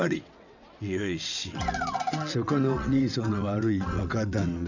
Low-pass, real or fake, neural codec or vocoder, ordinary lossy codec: 7.2 kHz; fake; vocoder, 22.05 kHz, 80 mel bands, WaveNeXt; none